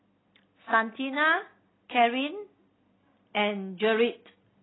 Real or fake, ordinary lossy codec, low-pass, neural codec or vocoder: real; AAC, 16 kbps; 7.2 kHz; none